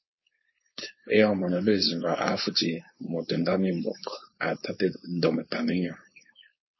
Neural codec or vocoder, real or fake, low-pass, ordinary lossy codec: codec, 16 kHz, 4.8 kbps, FACodec; fake; 7.2 kHz; MP3, 24 kbps